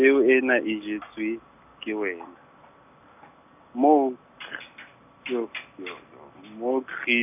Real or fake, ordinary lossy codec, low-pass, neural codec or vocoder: real; none; 3.6 kHz; none